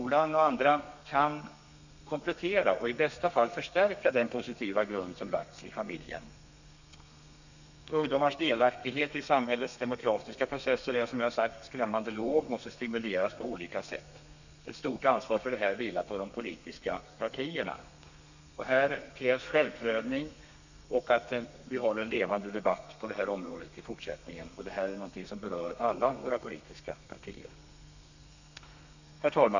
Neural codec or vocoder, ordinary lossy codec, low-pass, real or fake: codec, 32 kHz, 1.9 kbps, SNAC; none; 7.2 kHz; fake